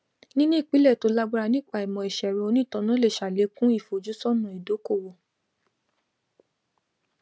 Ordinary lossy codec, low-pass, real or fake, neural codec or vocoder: none; none; real; none